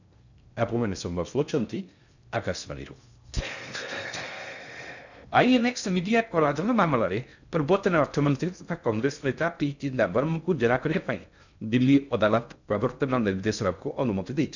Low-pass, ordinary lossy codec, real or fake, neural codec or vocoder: 7.2 kHz; none; fake; codec, 16 kHz in and 24 kHz out, 0.6 kbps, FocalCodec, streaming, 2048 codes